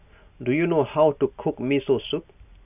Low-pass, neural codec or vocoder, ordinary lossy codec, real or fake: 3.6 kHz; none; none; real